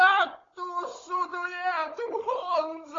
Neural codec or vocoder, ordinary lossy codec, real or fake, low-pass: codec, 16 kHz, 16 kbps, FunCodec, trained on Chinese and English, 50 frames a second; AAC, 32 kbps; fake; 7.2 kHz